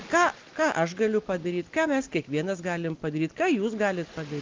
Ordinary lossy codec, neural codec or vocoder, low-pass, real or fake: Opus, 32 kbps; none; 7.2 kHz; real